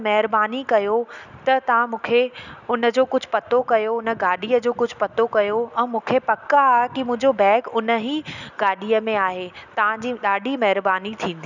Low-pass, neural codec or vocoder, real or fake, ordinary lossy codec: 7.2 kHz; none; real; none